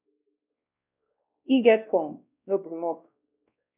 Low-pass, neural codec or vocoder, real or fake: 3.6 kHz; codec, 16 kHz, 1 kbps, X-Codec, WavLM features, trained on Multilingual LibriSpeech; fake